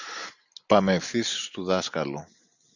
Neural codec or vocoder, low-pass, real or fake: none; 7.2 kHz; real